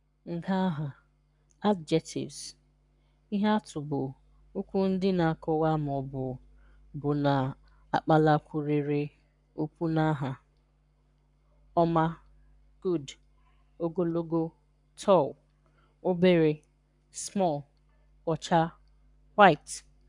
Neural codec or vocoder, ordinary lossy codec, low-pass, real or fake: codec, 44.1 kHz, 7.8 kbps, Pupu-Codec; none; 10.8 kHz; fake